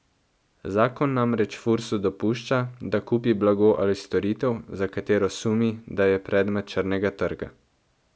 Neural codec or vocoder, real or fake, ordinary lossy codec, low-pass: none; real; none; none